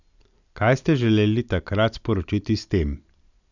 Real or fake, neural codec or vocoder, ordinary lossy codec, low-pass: real; none; none; 7.2 kHz